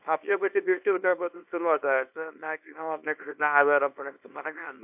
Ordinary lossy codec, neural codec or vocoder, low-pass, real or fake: AAC, 32 kbps; codec, 24 kHz, 0.9 kbps, WavTokenizer, small release; 3.6 kHz; fake